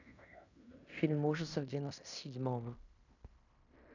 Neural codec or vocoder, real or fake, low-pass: codec, 16 kHz in and 24 kHz out, 0.9 kbps, LongCat-Audio-Codec, fine tuned four codebook decoder; fake; 7.2 kHz